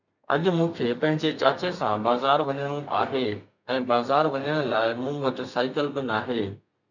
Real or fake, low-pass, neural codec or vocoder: fake; 7.2 kHz; codec, 32 kHz, 1.9 kbps, SNAC